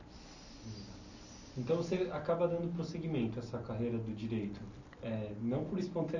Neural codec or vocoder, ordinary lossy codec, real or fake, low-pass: none; none; real; 7.2 kHz